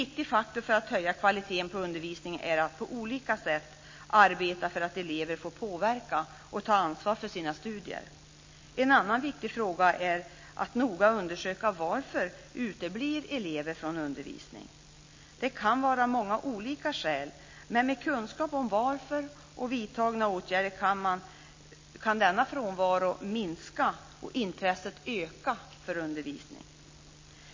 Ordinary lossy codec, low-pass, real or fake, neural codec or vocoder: MP3, 32 kbps; 7.2 kHz; real; none